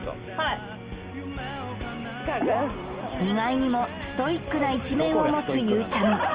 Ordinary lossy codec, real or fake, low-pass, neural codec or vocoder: Opus, 24 kbps; real; 3.6 kHz; none